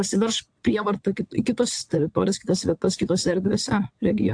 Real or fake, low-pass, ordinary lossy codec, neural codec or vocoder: fake; 9.9 kHz; AAC, 64 kbps; vocoder, 22.05 kHz, 80 mel bands, WaveNeXt